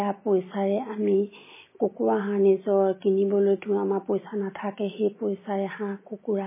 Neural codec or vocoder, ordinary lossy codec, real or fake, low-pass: none; MP3, 16 kbps; real; 3.6 kHz